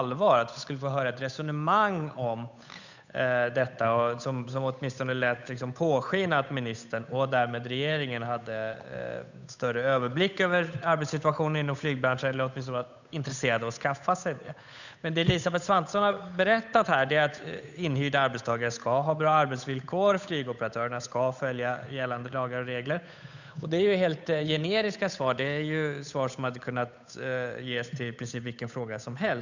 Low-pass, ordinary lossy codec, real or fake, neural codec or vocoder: 7.2 kHz; none; fake; codec, 16 kHz, 8 kbps, FunCodec, trained on Chinese and English, 25 frames a second